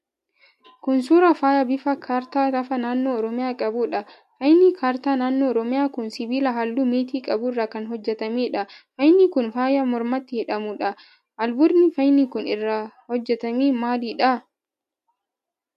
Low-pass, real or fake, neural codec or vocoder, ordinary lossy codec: 14.4 kHz; real; none; MP3, 64 kbps